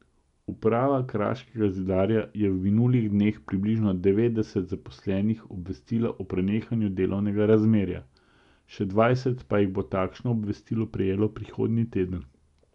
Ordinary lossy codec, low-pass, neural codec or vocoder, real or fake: none; 10.8 kHz; none; real